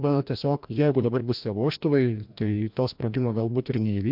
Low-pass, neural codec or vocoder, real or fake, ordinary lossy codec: 5.4 kHz; codec, 16 kHz, 1 kbps, FreqCodec, larger model; fake; MP3, 48 kbps